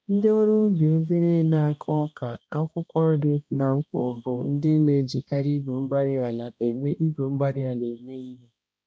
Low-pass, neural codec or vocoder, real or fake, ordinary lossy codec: none; codec, 16 kHz, 1 kbps, X-Codec, HuBERT features, trained on balanced general audio; fake; none